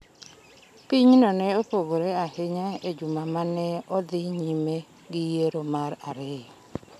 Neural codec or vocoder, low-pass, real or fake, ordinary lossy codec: none; 14.4 kHz; real; none